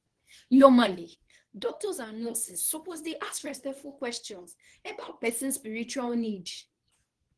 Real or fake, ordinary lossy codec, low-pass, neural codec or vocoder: fake; Opus, 16 kbps; 10.8 kHz; codec, 24 kHz, 0.9 kbps, WavTokenizer, medium speech release version 2